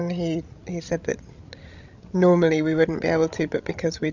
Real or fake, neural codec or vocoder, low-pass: fake; codec, 16 kHz, 16 kbps, FreqCodec, larger model; 7.2 kHz